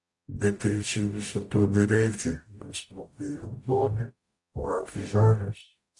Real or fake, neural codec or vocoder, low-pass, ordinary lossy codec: fake; codec, 44.1 kHz, 0.9 kbps, DAC; 10.8 kHz; AAC, 64 kbps